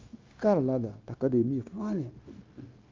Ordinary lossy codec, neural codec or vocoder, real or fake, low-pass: Opus, 24 kbps; codec, 16 kHz, 0.9 kbps, LongCat-Audio-Codec; fake; 7.2 kHz